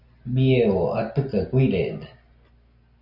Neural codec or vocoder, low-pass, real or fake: none; 5.4 kHz; real